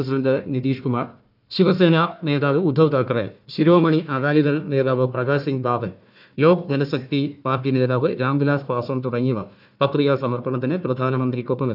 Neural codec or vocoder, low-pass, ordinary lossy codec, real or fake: codec, 16 kHz, 1 kbps, FunCodec, trained on Chinese and English, 50 frames a second; 5.4 kHz; none; fake